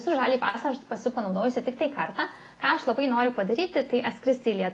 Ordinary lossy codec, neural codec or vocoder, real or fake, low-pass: AAC, 32 kbps; vocoder, 48 kHz, 128 mel bands, Vocos; fake; 10.8 kHz